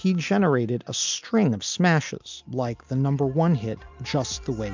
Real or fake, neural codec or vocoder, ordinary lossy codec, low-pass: real; none; MP3, 64 kbps; 7.2 kHz